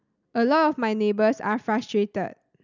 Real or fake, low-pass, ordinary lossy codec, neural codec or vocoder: real; 7.2 kHz; none; none